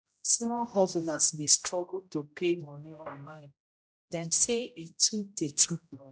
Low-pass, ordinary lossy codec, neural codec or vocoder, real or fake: none; none; codec, 16 kHz, 0.5 kbps, X-Codec, HuBERT features, trained on general audio; fake